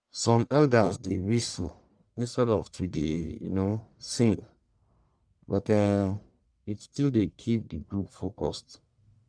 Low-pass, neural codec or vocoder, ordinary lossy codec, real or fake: 9.9 kHz; codec, 44.1 kHz, 1.7 kbps, Pupu-Codec; none; fake